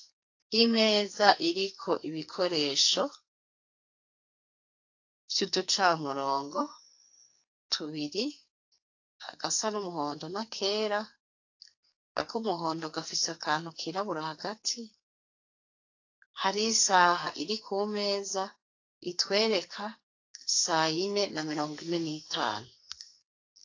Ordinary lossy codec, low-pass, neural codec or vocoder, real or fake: AAC, 48 kbps; 7.2 kHz; codec, 44.1 kHz, 2.6 kbps, SNAC; fake